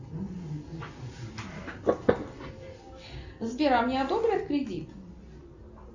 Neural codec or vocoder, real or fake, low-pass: none; real; 7.2 kHz